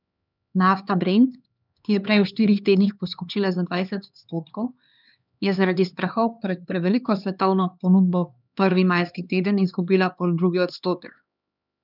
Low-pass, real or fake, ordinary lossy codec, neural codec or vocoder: 5.4 kHz; fake; none; codec, 16 kHz, 2 kbps, X-Codec, HuBERT features, trained on LibriSpeech